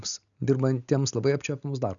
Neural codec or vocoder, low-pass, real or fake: none; 7.2 kHz; real